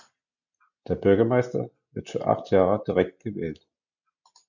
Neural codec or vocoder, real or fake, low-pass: none; real; 7.2 kHz